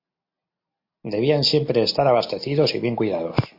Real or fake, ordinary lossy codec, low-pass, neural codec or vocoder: real; MP3, 32 kbps; 5.4 kHz; none